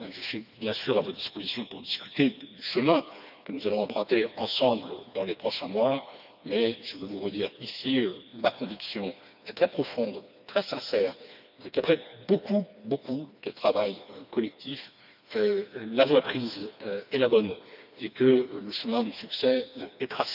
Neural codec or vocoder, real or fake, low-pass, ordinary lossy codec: codec, 16 kHz, 2 kbps, FreqCodec, smaller model; fake; 5.4 kHz; none